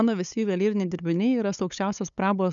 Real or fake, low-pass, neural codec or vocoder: fake; 7.2 kHz; codec, 16 kHz, 8 kbps, FunCodec, trained on LibriTTS, 25 frames a second